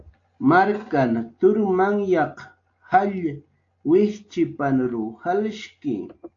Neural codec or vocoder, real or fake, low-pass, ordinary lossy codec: none; real; 7.2 kHz; AAC, 48 kbps